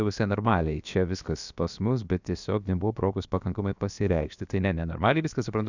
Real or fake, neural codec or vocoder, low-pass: fake; codec, 16 kHz, about 1 kbps, DyCAST, with the encoder's durations; 7.2 kHz